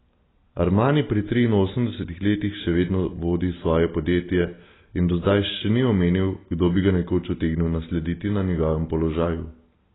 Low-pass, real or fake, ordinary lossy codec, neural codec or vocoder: 7.2 kHz; real; AAC, 16 kbps; none